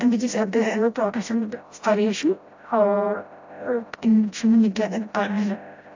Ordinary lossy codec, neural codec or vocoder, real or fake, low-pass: AAC, 48 kbps; codec, 16 kHz, 0.5 kbps, FreqCodec, smaller model; fake; 7.2 kHz